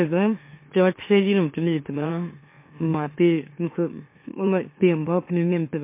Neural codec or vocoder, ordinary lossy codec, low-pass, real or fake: autoencoder, 44.1 kHz, a latent of 192 numbers a frame, MeloTTS; MP3, 24 kbps; 3.6 kHz; fake